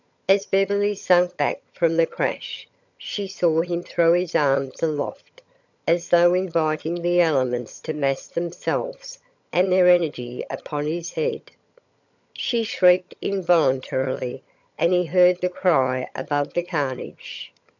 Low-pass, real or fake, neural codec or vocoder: 7.2 kHz; fake; vocoder, 22.05 kHz, 80 mel bands, HiFi-GAN